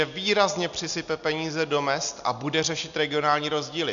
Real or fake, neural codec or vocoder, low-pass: real; none; 7.2 kHz